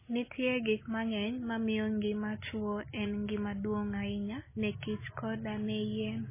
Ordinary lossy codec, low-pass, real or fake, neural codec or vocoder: MP3, 16 kbps; 3.6 kHz; real; none